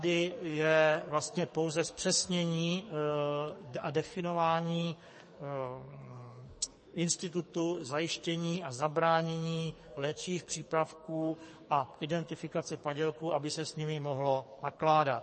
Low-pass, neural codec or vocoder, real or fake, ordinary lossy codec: 10.8 kHz; codec, 44.1 kHz, 2.6 kbps, SNAC; fake; MP3, 32 kbps